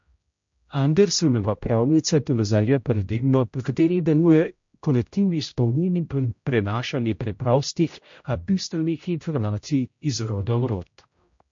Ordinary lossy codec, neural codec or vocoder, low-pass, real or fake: MP3, 48 kbps; codec, 16 kHz, 0.5 kbps, X-Codec, HuBERT features, trained on general audio; 7.2 kHz; fake